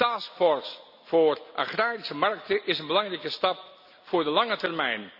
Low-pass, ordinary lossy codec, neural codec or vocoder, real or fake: 5.4 kHz; none; none; real